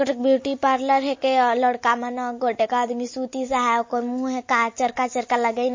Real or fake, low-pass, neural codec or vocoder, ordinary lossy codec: real; 7.2 kHz; none; MP3, 32 kbps